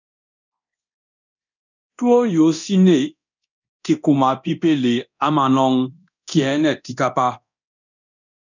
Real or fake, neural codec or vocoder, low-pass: fake; codec, 24 kHz, 0.9 kbps, DualCodec; 7.2 kHz